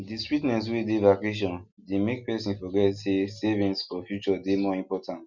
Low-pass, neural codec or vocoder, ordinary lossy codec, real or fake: 7.2 kHz; none; Opus, 64 kbps; real